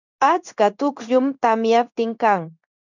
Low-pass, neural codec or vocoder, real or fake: 7.2 kHz; codec, 16 kHz in and 24 kHz out, 1 kbps, XY-Tokenizer; fake